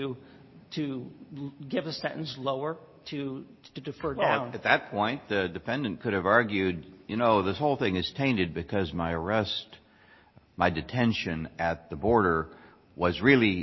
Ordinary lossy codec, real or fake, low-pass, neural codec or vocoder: MP3, 24 kbps; real; 7.2 kHz; none